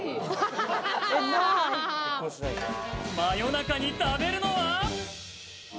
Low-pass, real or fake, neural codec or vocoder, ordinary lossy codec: none; real; none; none